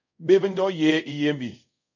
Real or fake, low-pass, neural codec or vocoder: fake; 7.2 kHz; codec, 24 kHz, 0.5 kbps, DualCodec